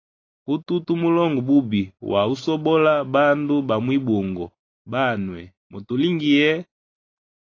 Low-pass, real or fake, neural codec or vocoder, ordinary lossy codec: 7.2 kHz; real; none; AAC, 32 kbps